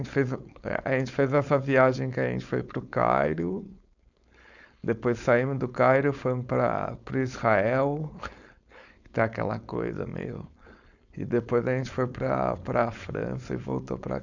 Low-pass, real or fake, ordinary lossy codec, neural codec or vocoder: 7.2 kHz; fake; none; codec, 16 kHz, 4.8 kbps, FACodec